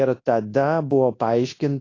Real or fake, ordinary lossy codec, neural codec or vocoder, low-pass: fake; AAC, 32 kbps; codec, 24 kHz, 0.9 kbps, WavTokenizer, large speech release; 7.2 kHz